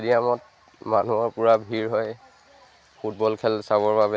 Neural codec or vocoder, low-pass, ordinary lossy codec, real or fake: none; none; none; real